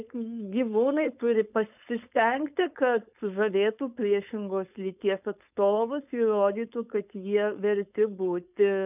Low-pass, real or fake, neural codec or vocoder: 3.6 kHz; fake; codec, 16 kHz, 4.8 kbps, FACodec